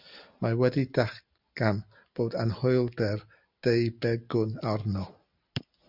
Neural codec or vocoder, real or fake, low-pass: none; real; 5.4 kHz